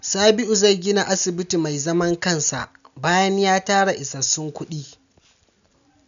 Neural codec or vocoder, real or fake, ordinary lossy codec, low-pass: none; real; none; 7.2 kHz